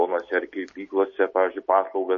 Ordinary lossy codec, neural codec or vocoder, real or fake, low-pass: MP3, 32 kbps; none; real; 7.2 kHz